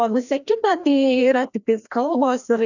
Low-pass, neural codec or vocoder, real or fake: 7.2 kHz; codec, 16 kHz, 1 kbps, FreqCodec, larger model; fake